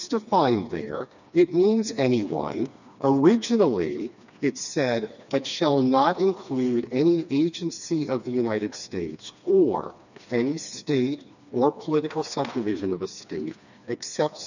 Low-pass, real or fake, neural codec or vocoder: 7.2 kHz; fake; codec, 16 kHz, 2 kbps, FreqCodec, smaller model